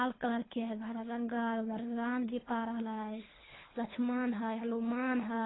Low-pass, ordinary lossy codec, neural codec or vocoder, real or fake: 7.2 kHz; AAC, 16 kbps; codec, 24 kHz, 3 kbps, HILCodec; fake